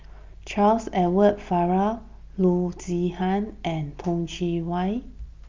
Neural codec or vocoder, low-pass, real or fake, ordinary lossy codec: none; 7.2 kHz; real; Opus, 24 kbps